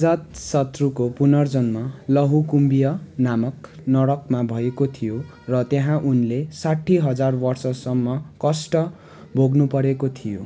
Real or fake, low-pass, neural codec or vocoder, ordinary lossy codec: real; none; none; none